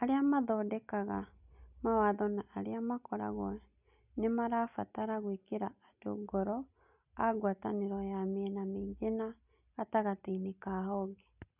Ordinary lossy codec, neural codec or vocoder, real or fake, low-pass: none; none; real; 3.6 kHz